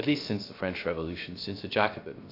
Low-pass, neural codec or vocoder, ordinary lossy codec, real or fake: 5.4 kHz; codec, 16 kHz, 0.3 kbps, FocalCodec; AAC, 32 kbps; fake